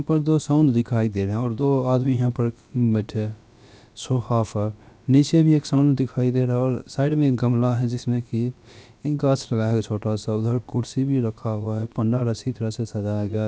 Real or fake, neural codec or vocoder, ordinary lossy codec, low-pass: fake; codec, 16 kHz, about 1 kbps, DyCAST, with the encoder's durations; none; none